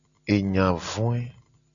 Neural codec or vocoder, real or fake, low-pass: none; real; 7.2 kHz